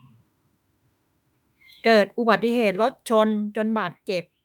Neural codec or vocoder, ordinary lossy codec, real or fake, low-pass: autoencoder, 48 kHz, 32 numbers a frame, DAC-VAE, trained on Japanese speech; none; fake; 19.8 kHz